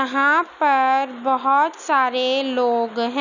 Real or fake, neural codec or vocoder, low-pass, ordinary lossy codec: real; none; 7.2 kHz; none